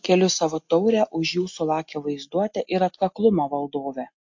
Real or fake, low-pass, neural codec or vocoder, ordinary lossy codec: real; 7.2 kHz; none; MP3, 48 kbps